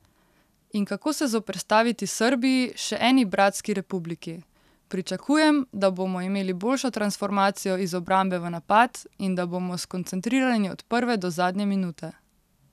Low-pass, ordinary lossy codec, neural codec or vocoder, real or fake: 14.4 kHz; none; none; real